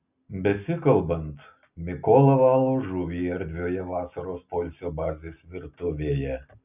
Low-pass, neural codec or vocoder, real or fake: 3.6 kHz; none; real